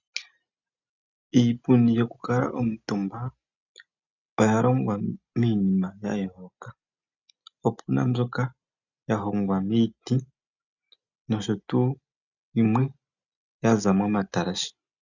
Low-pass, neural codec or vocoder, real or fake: 7.2 kHz; none; real